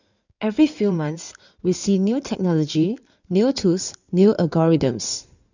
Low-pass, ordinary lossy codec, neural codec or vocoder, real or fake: 7.2 kHz; none; codec, 16 kHz in and 24 kHz out, 2.2 kbps, FireRedTTS-2 codec; fake